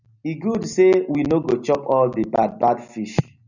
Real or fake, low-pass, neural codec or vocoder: real; 7.2 kHz; none